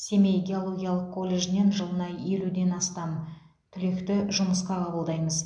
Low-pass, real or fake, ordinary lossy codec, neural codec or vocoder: 9.9 kHz; real; MP3, 64 kbps; none